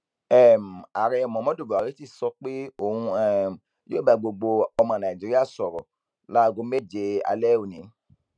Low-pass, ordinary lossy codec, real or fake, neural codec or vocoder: 9.9 kHz; none; real; none